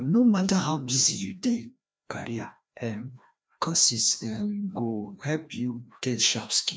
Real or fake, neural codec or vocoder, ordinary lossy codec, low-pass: fake; codec, 16 kHz, 1 kbps, FreqCodec, larger model; none; none